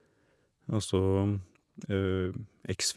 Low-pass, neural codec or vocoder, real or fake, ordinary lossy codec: none; vocoder, 24 kHz, 100 mel bands, Vocos; fake; none